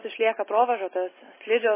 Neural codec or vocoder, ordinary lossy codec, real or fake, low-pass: none; MP3, 16 kbps; real; 3.6 kHz